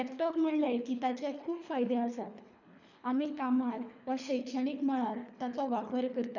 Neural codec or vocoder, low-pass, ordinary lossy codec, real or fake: codec, 24 kHz, 3 kbps, HILCodec; 7.2 kHz; none; fake